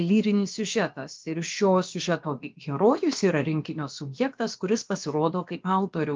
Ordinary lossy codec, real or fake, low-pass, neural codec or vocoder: Opus, 24 kbps; fake; 7.2 kHz; codec, 16 kHz, about 1 kbps, DyCAST, with the encoder's durations